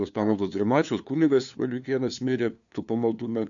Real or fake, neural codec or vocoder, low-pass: fake; codec, 16 kHz, 2 kbps, FunCodec, trained on LibriTTS, 25 frames a second; 7.2 kHz